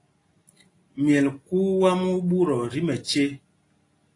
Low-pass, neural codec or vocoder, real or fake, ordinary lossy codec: 10.8 kHz; none; real; AAC, 32 kbps